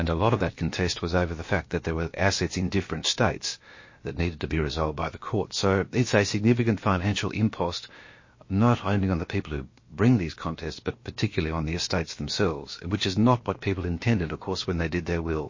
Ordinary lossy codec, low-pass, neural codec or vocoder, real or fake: MP3, 32 kbps; 7.2 kHz; codec, 16 kHz, about 1 kbps, DyCAST, with the encoder's durations; fake